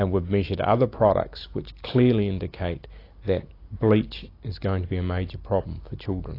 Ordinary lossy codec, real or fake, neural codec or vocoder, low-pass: AAC, 32 kbps; real; none; 5.4 kHz